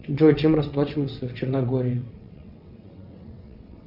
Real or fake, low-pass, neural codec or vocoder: fake; 5.4 kHz; vocoder, 22.05 kHz, 80 mel bands, WaveNeXt